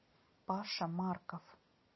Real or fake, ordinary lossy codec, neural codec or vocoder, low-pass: real; MP3, 24 kbps; none; 7.2 kHz